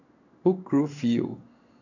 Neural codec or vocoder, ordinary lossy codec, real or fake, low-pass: codec, 16 kHz in and 24 kHz out, 1 kbps, XY-Tokenizer; none; fake; 7.2 kHz